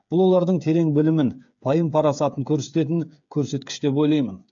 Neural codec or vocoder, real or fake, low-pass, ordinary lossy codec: codec, 16 kHz, 8 kbps, FreqCodec, smaller model; fake; 7.2 kHz; AAC, 64 kbps